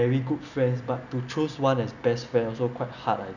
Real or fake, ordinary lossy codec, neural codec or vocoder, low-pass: real; none; none; 7.2 kHz